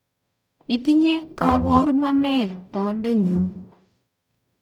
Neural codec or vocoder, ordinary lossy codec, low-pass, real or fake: codec, 44.1 kHz, 0.9 kbps, DAC; none; 19.8 kHz; fake